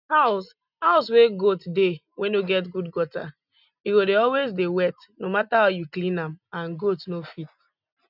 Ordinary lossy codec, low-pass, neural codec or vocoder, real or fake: none; 5.4 kHz; none; real